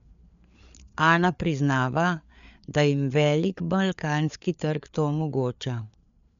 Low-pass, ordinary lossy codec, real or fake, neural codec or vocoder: 7.2 kHz; none; fake; codec, 16 kHz, 4 kbps, FreqCodec, larger model